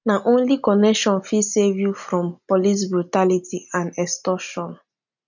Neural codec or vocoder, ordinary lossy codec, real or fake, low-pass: none; none; real; 7.2 kHz